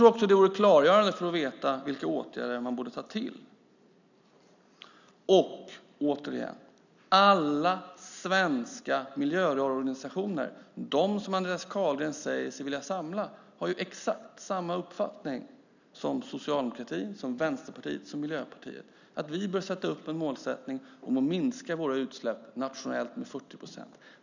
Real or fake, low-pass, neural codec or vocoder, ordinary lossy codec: real; 7.2 kHz; none; none